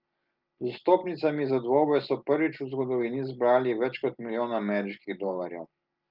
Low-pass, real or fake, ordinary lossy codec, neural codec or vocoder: 5.4 kHz; real; Opus, 32 kbps; none